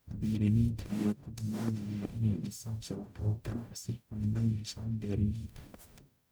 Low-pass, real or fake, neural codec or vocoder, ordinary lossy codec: none; fake; codec, 44.1 kHz, 0.9 kbps, DAC; none